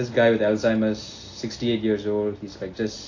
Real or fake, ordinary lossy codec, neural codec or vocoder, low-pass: real; AAC, 32 kbps; none; 7.2 kHz